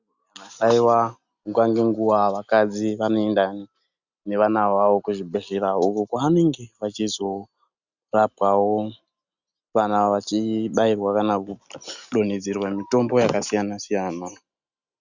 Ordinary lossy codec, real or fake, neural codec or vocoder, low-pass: Opus, 64 kbps; real; none; 7.2 kHz